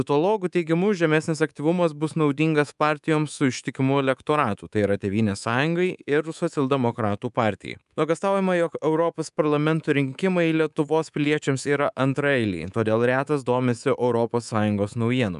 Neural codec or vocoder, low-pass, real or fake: codec, 24 kHz, 3.1 kbps, DualCodec; 10.8 kHz; fake